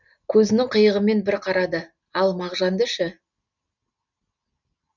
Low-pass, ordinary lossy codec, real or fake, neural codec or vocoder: 7.2 kHz; none; real; none